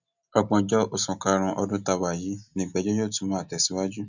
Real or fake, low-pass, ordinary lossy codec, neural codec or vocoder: real; 7.2 kHz; none; none